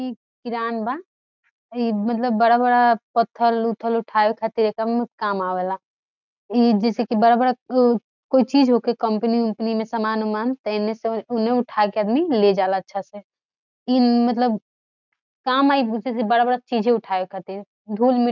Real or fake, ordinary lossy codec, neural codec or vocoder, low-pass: real; none; none; 7.2 kHz